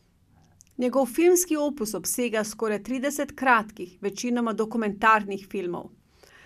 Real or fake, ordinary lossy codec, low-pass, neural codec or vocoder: real; none; 14.4 kHz; none